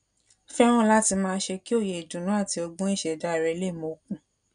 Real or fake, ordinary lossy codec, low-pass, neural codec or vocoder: real; none; 9.9 kHz; none